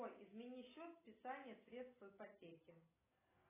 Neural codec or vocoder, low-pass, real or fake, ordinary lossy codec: none; 3.6 kHz; real; AAC, 16 kbps